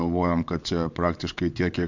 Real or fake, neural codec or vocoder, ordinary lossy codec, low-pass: fake; vocoder, 22.05 kHz, 80 mel bands, WaveNeXt; MP3, 64 kbps; 7.2 kHz